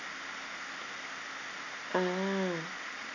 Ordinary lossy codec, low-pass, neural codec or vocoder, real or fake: none; 7.2 kHz; none; real